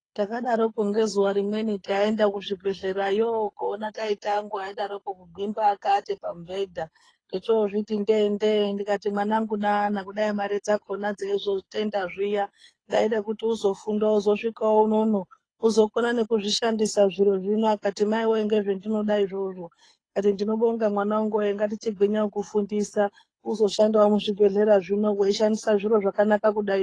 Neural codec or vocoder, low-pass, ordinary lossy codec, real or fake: codec, 24 kHz, 6 kbps, HILCodec; 9.9 kHz; AAC, 32 kbps; fake